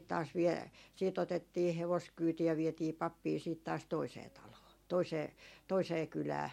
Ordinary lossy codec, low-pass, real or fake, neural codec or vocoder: MP3, 64 kbps; 19.8 kHz; real; none